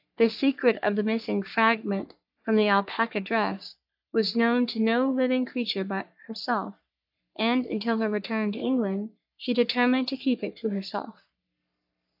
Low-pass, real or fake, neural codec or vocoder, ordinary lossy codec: 5.4 kHz; fake; codec, 44.1 kHz, 3.4 kbps, Pupu-Codec; AAC, 48 kbps